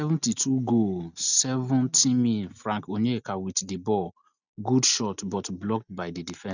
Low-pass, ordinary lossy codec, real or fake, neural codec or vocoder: 7.2 kHz; none; real; none